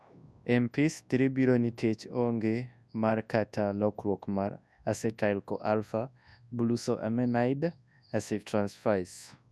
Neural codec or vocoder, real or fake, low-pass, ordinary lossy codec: codec, 24 kHz, 0.9 kbps, WavTokenizer, large speech release; fake; none; none